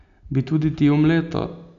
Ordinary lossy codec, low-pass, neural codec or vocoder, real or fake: none; 7.2 kHz; none; real